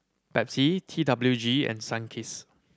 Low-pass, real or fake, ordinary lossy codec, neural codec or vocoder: none; real; none; none